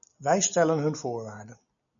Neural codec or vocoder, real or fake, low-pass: none; real; 7.2 kHz